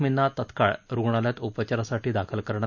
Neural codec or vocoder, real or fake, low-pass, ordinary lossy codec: none; real; 7.2 kHz; none